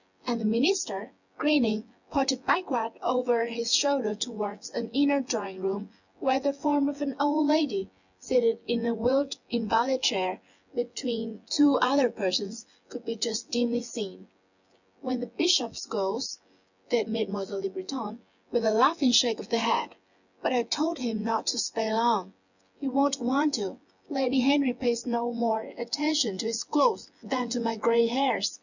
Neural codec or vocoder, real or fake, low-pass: vocoder, 24 kHz, 100 mel bands, Vocos; fake; 7.2 kHz